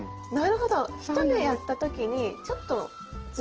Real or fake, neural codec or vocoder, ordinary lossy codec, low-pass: real; none; Opus, 16 kbps; 7.2 kHz